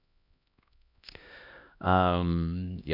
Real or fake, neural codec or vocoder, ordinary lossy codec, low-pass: fake; codec, 16 kHz, 1 kbps, X-Codec, HuBERT features, trained on LibriSpeech; none; 5.4 kHz